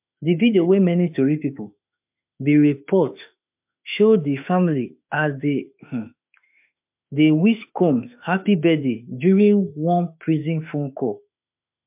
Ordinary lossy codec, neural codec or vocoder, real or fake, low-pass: MP3, 32 kbps; autoencoder, 48 kHz, 32 numbers a frame, DAC-VAE, trained on Japanese speech; fake; 3.6 kHz